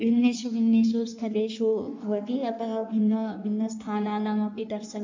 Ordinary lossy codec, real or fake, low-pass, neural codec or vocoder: MP3, 64 kbps; fake; 7.2 kHz; codec, 16 kHz in and 24 kHz out, 1.1 kbps, FireRedTTS-2 codec